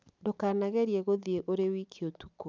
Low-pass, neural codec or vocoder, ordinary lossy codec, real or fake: none; none; none; real